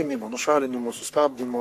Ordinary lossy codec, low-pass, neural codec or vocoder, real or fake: AAC, 64 kbps; 14.4 kHz; codec, 44.1 kHz, 3.4 kbps, Pupu-Codec; fake